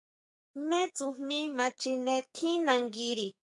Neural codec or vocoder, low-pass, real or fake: codec, 44.1 kHz, 2.6 kbps, SNAC; 10.8 kHz; fake